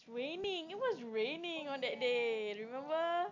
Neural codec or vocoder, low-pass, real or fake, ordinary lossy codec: none; 7.2 kHz; real; AAC, 48 kbps